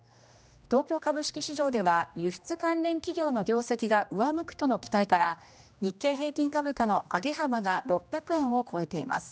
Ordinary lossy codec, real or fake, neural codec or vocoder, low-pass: none; fake; codec, 16 kHz, 1 kbps, X-Codec, HuBERT features, trained on general audio; none